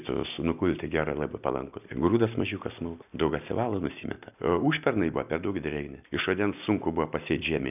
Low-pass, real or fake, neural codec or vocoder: 3.6 kHz; real; none